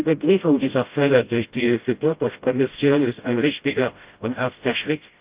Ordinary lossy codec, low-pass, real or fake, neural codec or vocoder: Opus, 32 kbps; 3.6 kHz; fake; codec, 16 kHz, 0.5 kbps, FreqCodec, smaller model